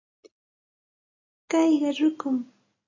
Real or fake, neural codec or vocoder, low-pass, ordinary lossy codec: real; none; 7.2 kHz; AAC, 32 kbps